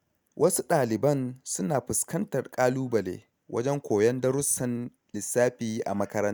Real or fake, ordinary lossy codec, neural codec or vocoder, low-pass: real; none; none; none